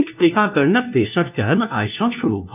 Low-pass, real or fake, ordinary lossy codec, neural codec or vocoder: 3.6 kHz; fake; none; codec, 16 kHz, 1 kbps, FunCodec, trained on LibriTTS, 50 frames a second